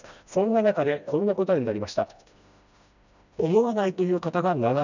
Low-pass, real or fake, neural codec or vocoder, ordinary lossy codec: 7.2 kHz; fake; codec, 16 kHz, 1 kbps, FreqCodec, smaller model; none